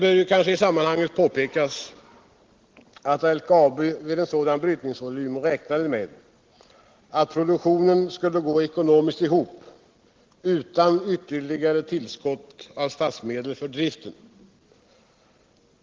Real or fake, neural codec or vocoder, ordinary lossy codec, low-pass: real; none; Opus, 16 kbps; 7.2 kHz